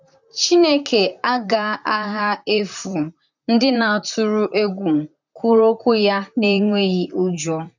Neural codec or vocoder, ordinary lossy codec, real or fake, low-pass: vocoder, 44.1 kHz, 128 mel bands, Pupu-Vocoder; none; fake; 7.2 kHz